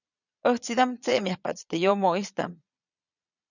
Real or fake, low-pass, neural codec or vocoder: fake; 7.2 kHz; vocoder, 22.05 kHz, 80 mel bands, Vocos